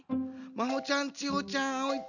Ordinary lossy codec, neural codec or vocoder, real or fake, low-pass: none; none; real; 7.2 kHz